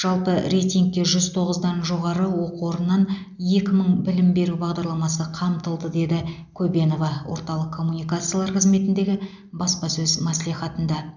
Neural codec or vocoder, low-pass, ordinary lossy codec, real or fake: none; 7.2 kHz; none; real